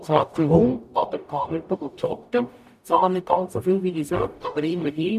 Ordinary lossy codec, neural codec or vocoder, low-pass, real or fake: none; codec, 44.1 kHz, 0.9 kbps, DAC; 14.4 kHz; fake